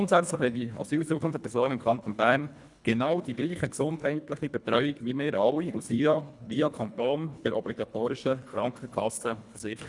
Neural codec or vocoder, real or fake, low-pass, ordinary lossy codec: codec, 24 kHz, 1.5 kbps, HILCodec; fake; 10.8 kHz; none